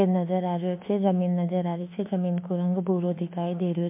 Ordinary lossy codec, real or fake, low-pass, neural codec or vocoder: MP3, 32 kbps; fake; 3.6 kHz; autoencoder, 48 kHz, 32 numbers a frame, DAC-VAE, trained on Japanese speech